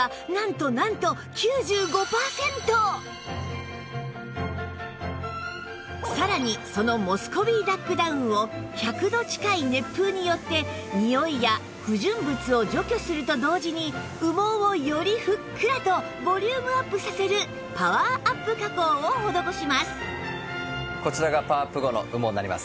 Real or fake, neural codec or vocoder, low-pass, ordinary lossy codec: real; none; none; none